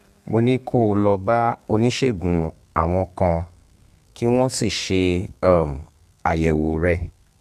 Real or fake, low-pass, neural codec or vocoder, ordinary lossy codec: fake; 14.4 kHz; codec, 32 kHz, 1.9 kbps, SNAC; none